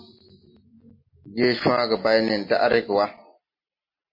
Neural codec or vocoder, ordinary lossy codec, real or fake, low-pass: none; MP3, 24 kbps; real; 5.4 kHz